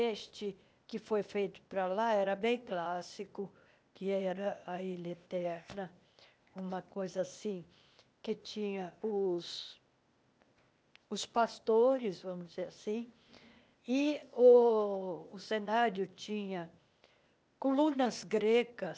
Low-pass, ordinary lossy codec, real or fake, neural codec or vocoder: none; none; fake; codec, 16 kHz, 0.8 kbps, ZipCodec